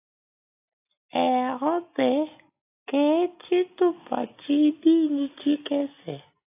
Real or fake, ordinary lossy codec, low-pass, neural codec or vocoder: real; AAC, 32 kbps; 3.6 kHz; none